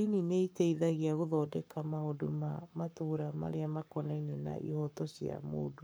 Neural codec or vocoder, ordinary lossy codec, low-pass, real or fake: codec, 44.1 kHz, 7.8 kbps, Pupu-Codec; none; none; fake